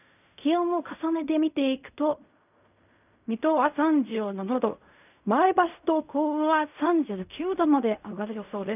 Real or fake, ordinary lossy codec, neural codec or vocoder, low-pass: fake; none; codec, 16 kHz in and 24 kHz out, 0.4 kbps, LongCat-Audio-Codec, fine tuned four codebook decoder; 3.6 kHz